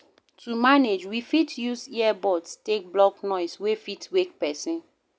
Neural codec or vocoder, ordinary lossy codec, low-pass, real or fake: none; none; none; real